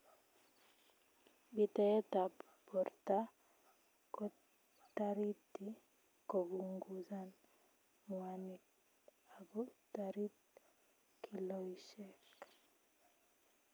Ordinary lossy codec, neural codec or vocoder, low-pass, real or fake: none; none; none; real